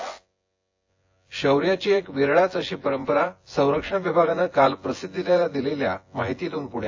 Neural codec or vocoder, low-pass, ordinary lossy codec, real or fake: vocoder, 24 kHz, 100 mel bands, Vocos; 7.2 kHz; AAC, 48 kbps; fake